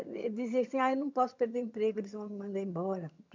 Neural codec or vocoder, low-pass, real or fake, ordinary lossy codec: vocoder, 22.05 kHz, 80 mel bands, HiFi-GAN; 7.2 kHz; fake; MP3, 64 kbps